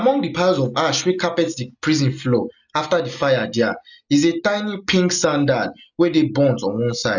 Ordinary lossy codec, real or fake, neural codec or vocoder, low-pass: none; real; none; 7.2 kHz